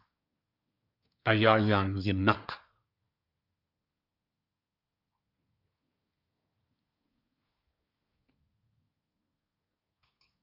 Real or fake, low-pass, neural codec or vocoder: fake; 5.4 kHz; codec, 24 kHz, 1 kbps, SNAC